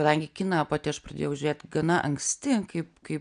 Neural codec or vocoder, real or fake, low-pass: none; real; 9.9 kHz